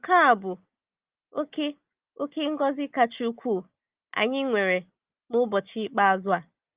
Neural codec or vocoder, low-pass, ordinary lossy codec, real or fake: none; 3.6 kHz; Opus, 32 kbps; real